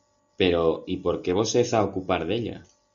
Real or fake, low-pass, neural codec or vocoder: real; 7.2 kHz; none